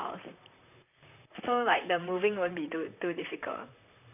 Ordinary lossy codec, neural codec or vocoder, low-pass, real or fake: none; vocoder, 44.1 kHz, 128 mel bands, Pupu-Vocoder; 3.6 kHz; fake